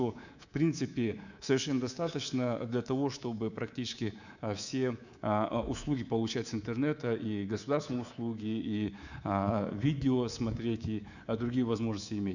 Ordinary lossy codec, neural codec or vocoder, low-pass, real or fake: none; codec, 24 kHz, 3.1 kbps, DualCodec; 7.2 kHz; fake